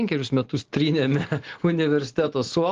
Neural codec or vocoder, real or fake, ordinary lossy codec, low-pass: none; real; Opus, 16 kbps; 7.2 kHz